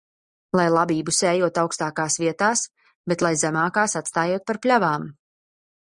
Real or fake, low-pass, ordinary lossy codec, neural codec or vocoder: real; 10.8 kHz; Opus, 64 kbps; none